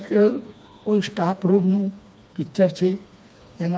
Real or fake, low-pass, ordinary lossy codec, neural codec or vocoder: fake; none; none; codec, 16 kHz, 2 kbps, FreqCodec, smaller model